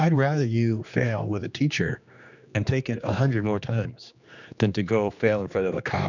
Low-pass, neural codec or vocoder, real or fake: 7.2 kHz; codec, 16 kHz, 2 kbps, X-Codec, HuBERT features, trained on general audio; fake